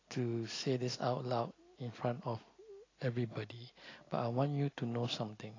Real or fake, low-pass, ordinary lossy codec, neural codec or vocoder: real; 7.2 kHz; AAC, 32 kbps; none